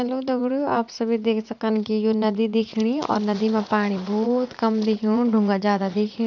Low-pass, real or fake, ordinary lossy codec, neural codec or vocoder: 7.2 kHz; fake; none; vocoder, 44.1 kHz, 80 mel bands, Vocos